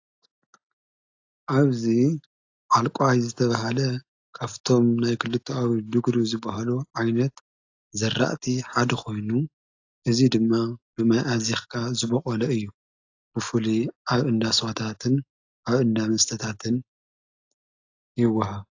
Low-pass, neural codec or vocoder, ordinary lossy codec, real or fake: 7.2 kHz; none; AAC, 48 kbps; real